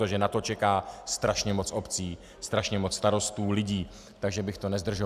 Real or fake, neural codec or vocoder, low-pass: real; none; 14.4 kHz